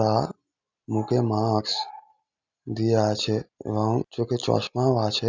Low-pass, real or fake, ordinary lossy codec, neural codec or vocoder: 7.2 kHz; real; none; none